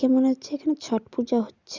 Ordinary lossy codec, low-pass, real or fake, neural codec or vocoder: Opus, 64 kbps; 7.2 kHz; real; none